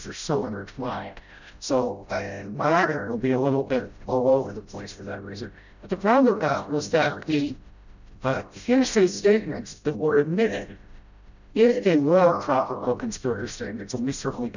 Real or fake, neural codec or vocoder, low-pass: fake; codec, 16 kHz, 0.5 kbps, FreqCodec, smaller model; 7.2 kHz